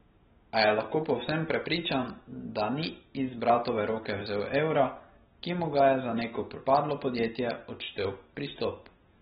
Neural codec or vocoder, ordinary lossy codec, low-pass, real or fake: none; AAC, 16 kbps; 7.2 kHz; real